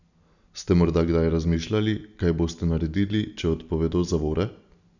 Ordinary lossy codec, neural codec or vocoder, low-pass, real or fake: none; none; 7.2 kHz; real